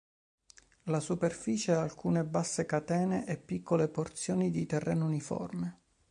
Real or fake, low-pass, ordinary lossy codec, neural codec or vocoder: real; 9.9 kHz; MP3, 64 kbps; none